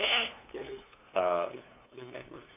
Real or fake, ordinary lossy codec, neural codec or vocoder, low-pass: fake; AAC, 32 kbps; codec, 16 kHz, 2 kbps, FunCodec, trained on LibriTTS, 25 frames a second; 3.6 kHz